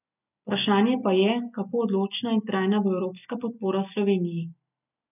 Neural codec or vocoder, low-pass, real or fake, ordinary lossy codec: none; 3.6 kHz; real; none